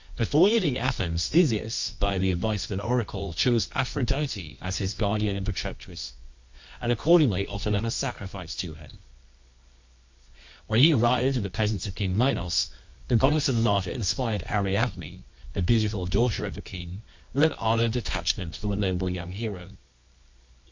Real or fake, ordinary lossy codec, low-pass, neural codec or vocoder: fake; MP3, 48 kbps; 7.2 kHz; codec, 24 kHz, 0.9 kbps, WavTokenizer, medium music audio release